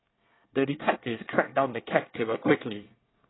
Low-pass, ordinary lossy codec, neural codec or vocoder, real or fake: 7.2 kHz; AAC, 16 kbps; codec, 24 kHz, 1 kbps, SNAC; fake